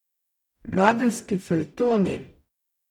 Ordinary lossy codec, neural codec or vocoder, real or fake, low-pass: none; codec, 44.1 kHz, 0.9 kbps, DAC; fake; 19.8 kHz